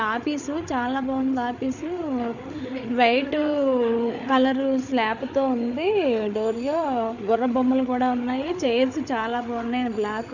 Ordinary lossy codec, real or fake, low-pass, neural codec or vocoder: none; fake; 7.2 kHz; codec, 16 kHz, 8 kbps, FreqCodec, larger model